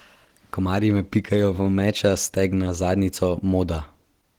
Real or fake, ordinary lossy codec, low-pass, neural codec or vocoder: real; Opus, 16 kbps; 19.8 kHz; none